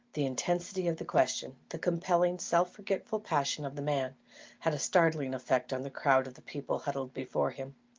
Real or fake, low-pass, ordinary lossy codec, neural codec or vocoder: real; 7.2 kHz; Opus, 24 kbps; none